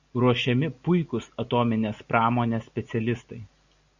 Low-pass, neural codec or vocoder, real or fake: 7.2 kHz; none; real